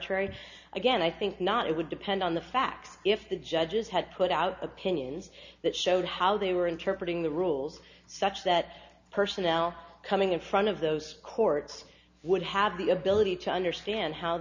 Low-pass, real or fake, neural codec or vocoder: 7.2 kHz; real; none